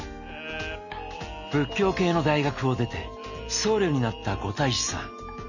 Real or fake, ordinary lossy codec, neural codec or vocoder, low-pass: real; none; none; 7.2 kHz